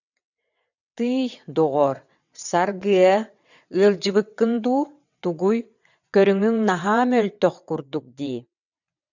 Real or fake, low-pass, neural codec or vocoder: fake; 7.2 kHz; vocoder, 22.05 kHz, 80 mel bands, WaveNeXt